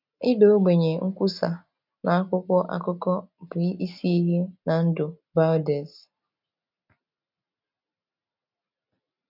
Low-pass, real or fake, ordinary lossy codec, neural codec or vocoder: 5.4 kHz; real; none; none